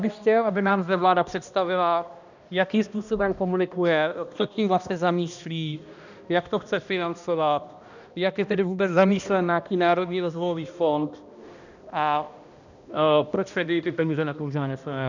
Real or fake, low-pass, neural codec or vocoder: fake; 7.2 kHz; codec, 16 kHz, 1 kbps, X-Codec, HuBERT features, trained on balanced general audio